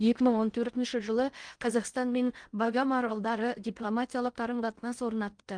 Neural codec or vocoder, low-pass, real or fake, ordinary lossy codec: codec, 16 kHz in and 24 kHz out, 0.6 kbps, FocalCodec, streaming, 2048 codes; 9.9 kHz; fake; Opus, 32 kbps